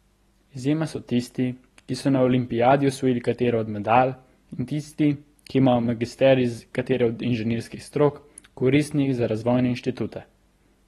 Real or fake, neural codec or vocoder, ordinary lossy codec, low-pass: real; none; AAC, 32 kbps; 19.8 kHz